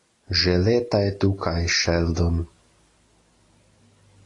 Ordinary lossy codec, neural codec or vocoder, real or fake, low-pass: AAC, 32 kbps; none; real; 10.8 kHz